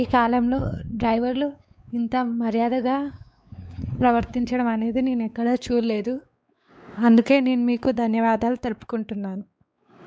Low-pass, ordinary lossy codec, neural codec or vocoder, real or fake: none; none; codec, 16 kHz, 4 kbps, X-Codec, WavLM features, trained on Multilingual LibriSpeech; fake